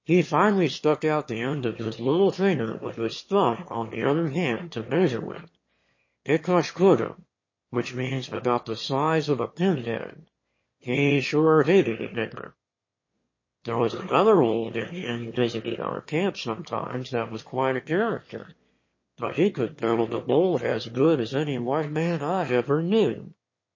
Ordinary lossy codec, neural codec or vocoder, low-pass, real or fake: MP3, 32 kbps; autoencoder, 22.05 kHz, a latent of 192 numbers a frame, VITS, trained on one speaker; 7.2 kHz; fake